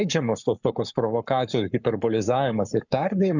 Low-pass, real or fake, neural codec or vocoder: 7.2 kHz; fake; codec, 44.1 kHz, 7.8 kbps, Pupu-Codec